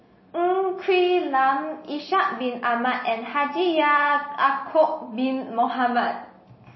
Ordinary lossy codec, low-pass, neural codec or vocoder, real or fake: MP3, 24 kbps; 7.2 kHz; none; real